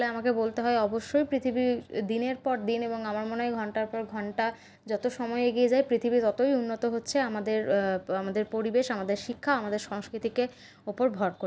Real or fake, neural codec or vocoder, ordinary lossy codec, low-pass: real; none; none; none